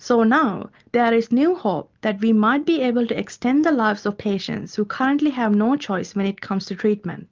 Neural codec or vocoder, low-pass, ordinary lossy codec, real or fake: none; 7.2 kHz; Opus, 24 kbps; real